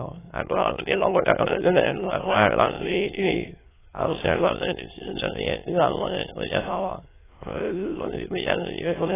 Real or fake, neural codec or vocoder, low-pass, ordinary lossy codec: fake; autoencoder, 22.05 kHz, a latent of 192 numbers a frame, VITS, trained on many speakers; 3.6 kHz; AAC, 16 kbps